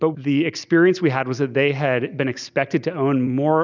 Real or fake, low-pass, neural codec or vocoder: real; 7.2 kHz; none